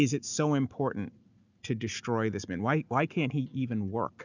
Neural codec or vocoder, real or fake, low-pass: none; real; 7.2 kHz